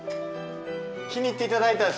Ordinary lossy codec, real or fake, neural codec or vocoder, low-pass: none; real; none; none